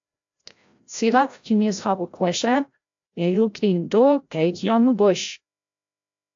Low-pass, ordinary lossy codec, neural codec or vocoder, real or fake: 7.2 kHz; AAC, 64 kbps; codec, 16 kHz, 0.5 kbps, FreqCodec, larger model; fake